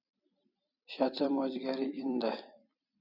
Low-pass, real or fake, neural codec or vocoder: 5.4 kHz; fake; vocoder, 44.1 kHz, 128 mel bands every 256 samples, BigVGAN v2